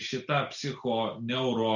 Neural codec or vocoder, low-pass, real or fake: none; 7.2 kHz; real